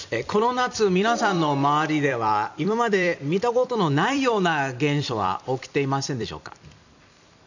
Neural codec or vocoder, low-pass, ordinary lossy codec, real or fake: vocoder, 44.1 kHz, 128 mel bands every 256 samples, BigVGAN v2; 7.2 kHz; none; fake